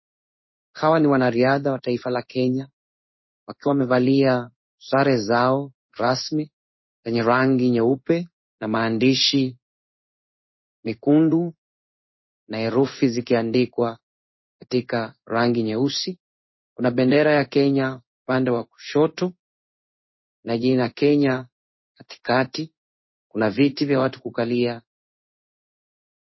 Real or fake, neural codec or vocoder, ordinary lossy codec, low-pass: fake; codec, 16 kHz in and 24 kHz out, 1 kbps, XY-Tokenizer; MP3, 24 kbps; 7.2 kHz